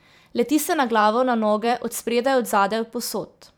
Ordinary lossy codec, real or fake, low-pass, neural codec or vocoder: none; fake; none; vocoder, 44.1 kHz, 128 mel bands every 512 samples, BigVGAN v2